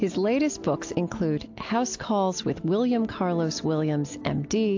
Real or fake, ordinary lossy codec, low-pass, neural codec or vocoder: real; AAC, 48 kbps; 7.2 kHz; none